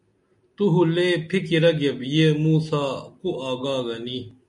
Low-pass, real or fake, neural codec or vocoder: 10.8 kHz; real; none